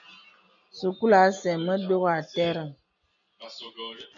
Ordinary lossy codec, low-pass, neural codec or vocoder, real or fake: MP3, 96 kbps; 7.2 kHz; none; real